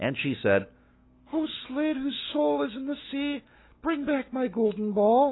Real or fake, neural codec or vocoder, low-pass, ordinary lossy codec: fake; autoencoder, 48 kHz, 128 numbers a frame, DAC-VAE, trained on Japanese speech; 7.2 kHz; AAC, 16 kbps